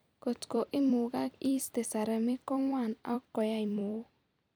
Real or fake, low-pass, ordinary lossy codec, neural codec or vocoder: fake; none; none; vocoder, 44.1 kHz, 128 mel bands every 256 samples, BigVGAN v2